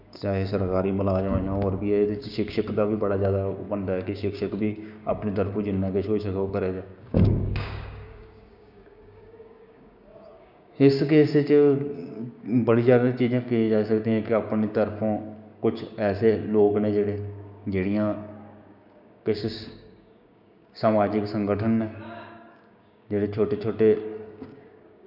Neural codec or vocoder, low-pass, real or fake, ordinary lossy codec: codec, 16 kHz, 6 kbps, DAC; 5.4 kHz; fake; none